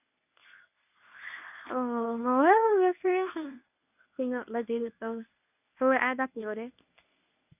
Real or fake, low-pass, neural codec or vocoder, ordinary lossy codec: fake; 3.6 kHz; codec, 24 kHz, 0.9 kbps, WavTokenizer, medium speech release version 1; none